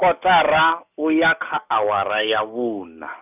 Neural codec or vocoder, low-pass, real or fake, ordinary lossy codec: none; 3.6 kHz; real; none